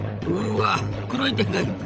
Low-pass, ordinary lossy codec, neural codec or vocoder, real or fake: none; none; codec, 16 kHz, 16 kbps, FunCodec, trained on LibriTTS, 50 frames a second; fake